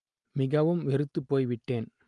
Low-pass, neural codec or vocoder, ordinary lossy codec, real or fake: 10.8 kHz; none; Opus, 32 kbps; real